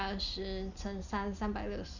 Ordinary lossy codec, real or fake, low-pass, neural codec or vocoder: none; real; 7.2 kHz; none